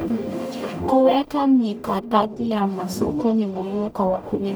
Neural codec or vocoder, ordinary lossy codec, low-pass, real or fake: codec, 44.1 kHz, 0.9 kbps, DAC; none; none; fake